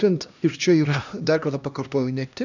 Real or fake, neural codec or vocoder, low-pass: fake; codec, 16 kHz, 1 kbps, X-Codec, HuBERT features, trained on LibriSpeech; 7.2 kHz